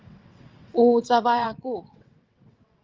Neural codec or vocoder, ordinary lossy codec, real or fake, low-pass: vocoder, 22.05 kHz, 80 mel bands, WaveNeXt; Opus, 32 kbps; fake; 7.2 kHz